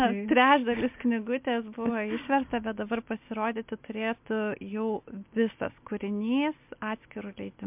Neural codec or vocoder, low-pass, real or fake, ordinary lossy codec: none; 3.6 kHz; real; MP3, 32 kbps